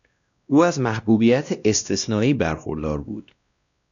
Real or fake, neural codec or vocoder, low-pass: fake; codec, 16 kHz, 1 kbps, X-Codec, WavLM features, trained on Multilingual LibriSpeech; 7.2 kHz